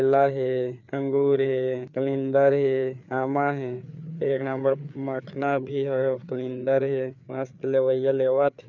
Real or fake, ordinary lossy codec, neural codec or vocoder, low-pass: fake; none; codec, 16 kHz, 4 kbps, FreqCodec, larger model; 7.2 kHz